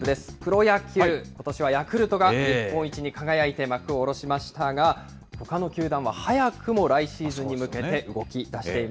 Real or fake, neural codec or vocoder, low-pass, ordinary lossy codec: real; none; none; none